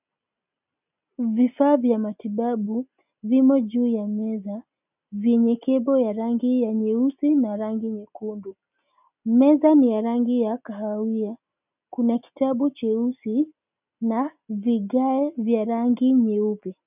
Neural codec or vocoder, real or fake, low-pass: none; real; 3.6 kHz